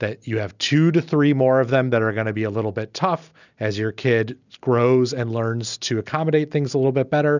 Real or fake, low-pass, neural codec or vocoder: real; 7.2 kHz; none